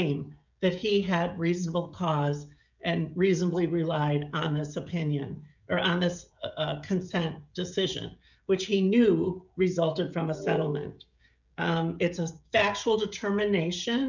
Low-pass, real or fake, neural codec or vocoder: 7.2 kHz; fake; codec, 16 kHz, 16 kbps, FreqCodec, smaller model